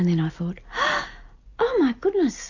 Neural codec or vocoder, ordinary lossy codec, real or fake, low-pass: none; AAC, 48 kbps; real; 7.2 kHz